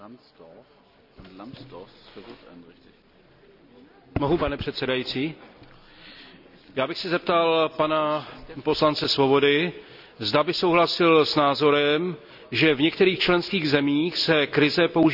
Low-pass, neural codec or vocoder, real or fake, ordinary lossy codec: 5.4 kHz; none; real; none